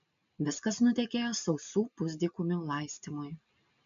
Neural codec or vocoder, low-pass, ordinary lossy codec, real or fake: none; 7.2 kHz; MP3, 64 kbps; real